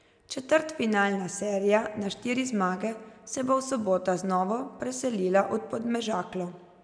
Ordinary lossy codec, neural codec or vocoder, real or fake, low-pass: none; none; real; 9.9 kHz